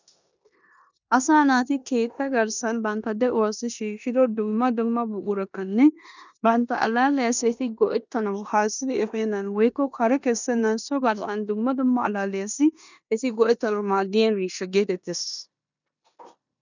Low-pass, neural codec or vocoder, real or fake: 7.2 kHz; codec, 16 kHz in and 24 kHz out, 0.9 kbps, LongCat-Audio-Codec, four codebook decoder; fake